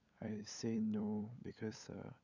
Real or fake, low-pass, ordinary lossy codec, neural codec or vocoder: fake; 7.2 kHz; none; codec, 16 kHz, 8 kbps, FunCodec, trained on LibriTTS, 25 frames a second